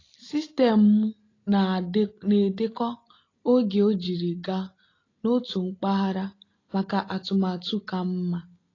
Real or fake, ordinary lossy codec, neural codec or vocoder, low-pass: real; AAC, 32 kbps; none; 7.2 kHz